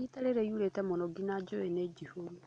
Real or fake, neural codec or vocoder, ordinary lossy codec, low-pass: real; none; MP3, 64 kbps; 9.9 kHz